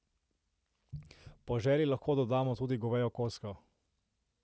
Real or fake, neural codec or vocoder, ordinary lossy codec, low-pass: real; none; none; none